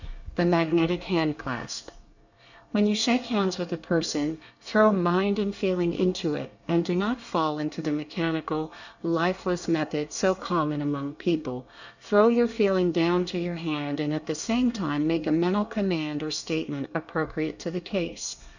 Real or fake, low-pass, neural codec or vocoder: fake; 7.2 kHz; codec, 24 kHz, 1 kbps, SNAC